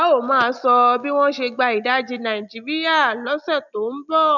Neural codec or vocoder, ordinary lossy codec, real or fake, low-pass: none; none; real; 7.2 kHz